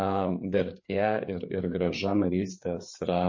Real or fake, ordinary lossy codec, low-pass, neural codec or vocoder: fake; MP3, 32 kbps; 7.2 kHz; codec, 16 kHz, 4 kbps, FreqCodec, larger model